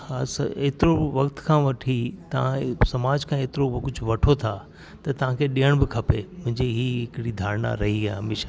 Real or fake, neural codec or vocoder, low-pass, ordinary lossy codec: real; none; none; none